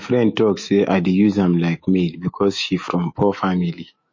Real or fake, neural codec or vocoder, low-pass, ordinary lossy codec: fake; autoencoder, 48 kHz, 128 numbers a frame, DAC-VAE, trained on Japanese speech; 7.2 kHz; MP3, 32 kbps